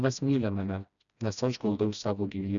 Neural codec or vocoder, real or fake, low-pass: codec, 16 kHz, 1 kbps, FreqCodec, smaller model; fake; 7.2 kHz